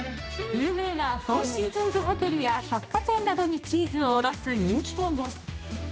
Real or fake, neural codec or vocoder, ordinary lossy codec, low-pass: fake; codec, 16 kHz, 1 kbps, X-Codec, HuBERT features, trained on general audio; none; none